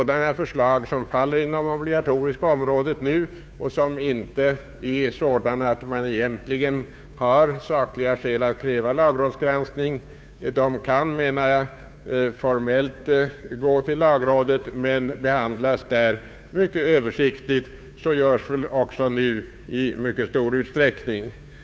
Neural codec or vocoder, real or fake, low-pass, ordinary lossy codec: codec, 16 kHz, 2 kbps, FunCodec, trained on Chinese and English, 25 frames a second; fake; none; none